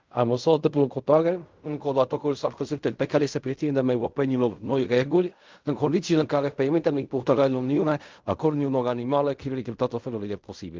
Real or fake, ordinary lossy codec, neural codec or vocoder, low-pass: fake; Opus, 24 kbps; codec, 16 kHz in and 24 kHz out, 0.4 kbps, LongCat-Audio-Codec, fine tuned four codebook decoder; 7.2 kHz